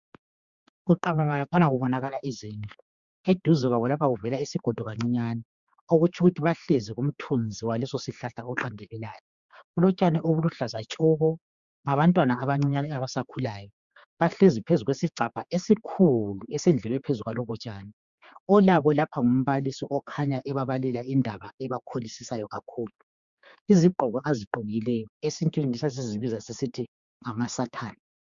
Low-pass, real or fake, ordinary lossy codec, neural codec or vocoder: 7.2 kHz; fake; Opus, 64 kbps; codec, 16 kHz, 4 kbps, X-Codec, HuBERT features, trained on general audio